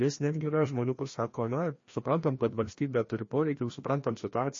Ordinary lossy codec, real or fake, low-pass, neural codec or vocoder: MP3, 32 kbps; fake; 7.2 kHz; codec, 16 kHz, 1 kbps, FreqCodec, larger model